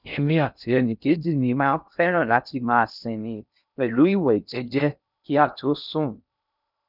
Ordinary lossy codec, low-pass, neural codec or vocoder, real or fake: none; 5.4 kHz; codec, 16 kHz in and 24 kHz out, 0.6 kbps, FocalCodec, streaming, 2048 codes; fake